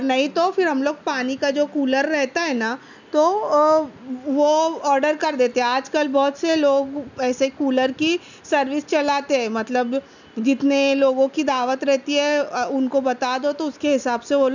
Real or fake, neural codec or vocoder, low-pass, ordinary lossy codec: real; none; 7.2 kHz; none